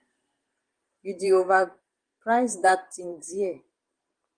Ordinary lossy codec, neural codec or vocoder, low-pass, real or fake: Opus, 24 kbps; vocoder, 44.1 kHz, 128 mel bands every 512 samples, BigVGAN v2; 9.9 kHz; fake